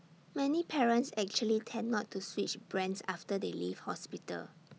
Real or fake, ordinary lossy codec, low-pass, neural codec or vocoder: real; none; none; none